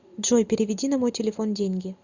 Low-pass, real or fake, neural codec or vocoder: 7.2 kHz; real; none